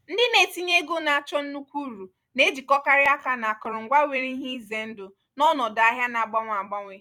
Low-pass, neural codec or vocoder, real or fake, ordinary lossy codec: 19.8 kHz; vocoder, 44.1 kHz, 128 mel bands every 256 samples, BigVGAN v2; fake; none